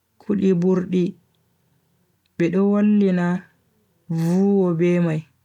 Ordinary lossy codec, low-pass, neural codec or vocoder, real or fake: none; 19.8 kHz; none; real